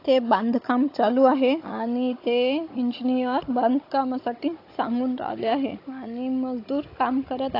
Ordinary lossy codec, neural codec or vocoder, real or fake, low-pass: AAC, 32 kbps; codec, 16 kHz, 16 kbps, FunCodec, trained on Chinese and English, 50 frames a second; fake; 5.4 kHz